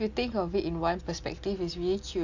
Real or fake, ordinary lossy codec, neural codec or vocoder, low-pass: real; none; none; 7.2 kHz